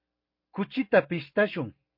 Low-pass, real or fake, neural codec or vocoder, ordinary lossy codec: 5.4 kHz; real; none; MP3, 32 kbps